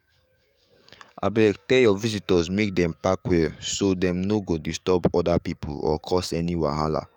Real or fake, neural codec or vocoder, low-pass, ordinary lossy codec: fake; codec, 44.1 kHz, 7.8 kbps, DAC; 19.8 kHz; none